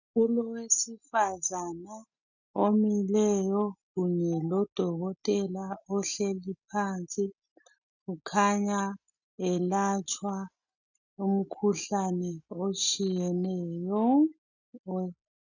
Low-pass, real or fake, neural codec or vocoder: 7.2 kHz; real; none